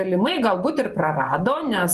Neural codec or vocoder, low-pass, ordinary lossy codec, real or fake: vocoder, 48 kHz, 128 mel bands, Vocos; 14.4 kHz; Opus, 24 kbps; fake